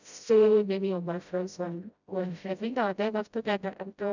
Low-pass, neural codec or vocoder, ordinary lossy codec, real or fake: 7.2 kHz; codec, 16 kHz, 0.5 kbps, FreqCodec, smaller model; none; fake